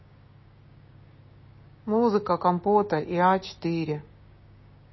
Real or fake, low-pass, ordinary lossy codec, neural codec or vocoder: fake; 7.2 kHz; MP3, 24 kbps; codec, 16 kHz, 6 kbps, DAC